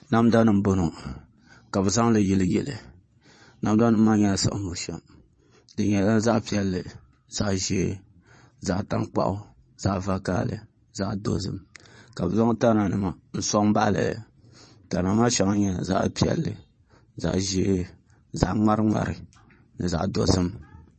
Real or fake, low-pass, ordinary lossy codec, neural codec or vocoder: fake; 9.9 kHz; MP3, 32 kbps; vocoder, 22.05 kHz, 80 mel bands, Vocos